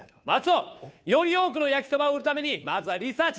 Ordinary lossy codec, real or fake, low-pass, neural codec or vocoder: none; fake; none; codec, 16 kHz, 2 kbps, FunCodec, trained on Chinese and English, 25 frames a second